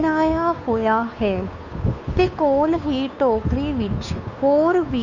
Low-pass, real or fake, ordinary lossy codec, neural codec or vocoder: 7.2 kHz; fake; none; codec, 16 kHz, 2 kbps, FunCodec, trained on Chinese and English, 25 frames a second